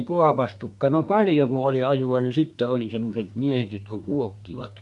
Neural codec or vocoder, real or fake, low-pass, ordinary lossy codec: codec, 24 kHz, 1 kbps, SNAC; fake; 10.8 kHz; none